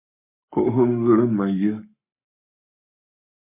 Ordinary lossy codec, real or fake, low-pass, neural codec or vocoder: MP3, 24 kbps; fake; 3.6 kHz; codec, 44.1 kHz, 7.8 kbps, Pupu-Codec